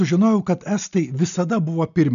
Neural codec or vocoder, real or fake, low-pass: none; real; 7.2 kHz